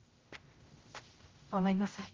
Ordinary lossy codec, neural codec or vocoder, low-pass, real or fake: Opus, 32 kbps; codec, 16 kHz, 0.5 kbps, X-Codec, HuBERT features, trained on general audio; 7.2 kHz; fake